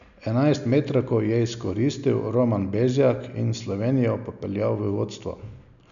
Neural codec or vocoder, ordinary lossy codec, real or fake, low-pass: none; none; real; 7.2 kHz